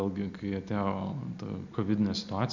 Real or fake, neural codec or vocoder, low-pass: real; none; 7.2 kHz